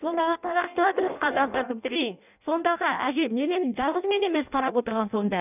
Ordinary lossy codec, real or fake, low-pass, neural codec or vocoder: none; fake; 3.6 kHz; codec, 16 kHz in and 24 kHz out, 0.6 kbps, FireRedTTS-2 codec